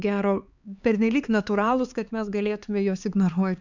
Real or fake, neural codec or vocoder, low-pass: fake; codec, 16 kHz, 4 kbps, X-Codec, HuBERT features, trained on LibriSpeech; 7.2 kHz